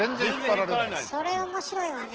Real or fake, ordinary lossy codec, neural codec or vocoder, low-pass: real; Opus, 16 kbps; none; 7.2 kHz